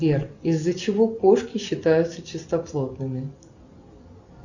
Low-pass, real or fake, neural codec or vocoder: 7.2 kHz; real; none